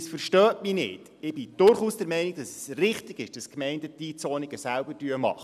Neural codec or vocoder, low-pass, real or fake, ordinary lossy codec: none; 14.4 kHz; real; none